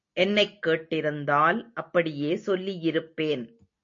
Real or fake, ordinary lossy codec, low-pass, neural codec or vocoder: real; MP3, 48 kbps; 7.2 kHz; none